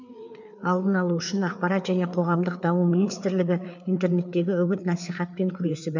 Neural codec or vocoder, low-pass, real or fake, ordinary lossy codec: codec, 16 kHz, 4 kbps, FreqCodec, larger model; 7.2 kHz; fake; none